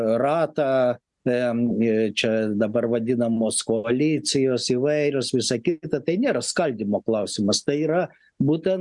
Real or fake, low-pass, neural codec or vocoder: real; 10.8 kHz; none